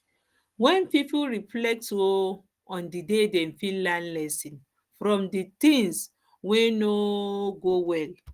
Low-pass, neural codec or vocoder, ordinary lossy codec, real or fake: 14.4 kHz; none; Opus, 24 kbps; real